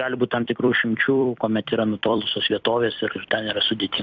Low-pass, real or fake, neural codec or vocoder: 7.2 kHz; fake; vocoder, 44.1 kHz, 128 mel bands every 256 samples, BigVGAN v2